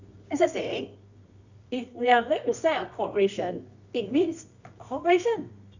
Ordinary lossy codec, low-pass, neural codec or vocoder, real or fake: Opus, 64 kbps; 7.2 kHz; codec, 24 kHz, 0.9 kbps, WavTokenizer, medium music audio release; fake